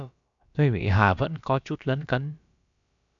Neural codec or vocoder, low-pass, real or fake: codec, 16 kHz, about 1 kbps, DyCAST, with the encoder's durations; 7.2 kHz; fake